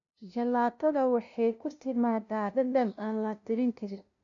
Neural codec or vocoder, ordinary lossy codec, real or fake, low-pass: codec, 16 kHz, 0.5 kbps, FunCodec, trained on LibriTTS, 25 frames a second; none; fake; 7.2 kHz